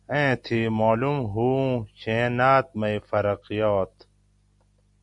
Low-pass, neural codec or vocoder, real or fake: 10.8 kHz; none; real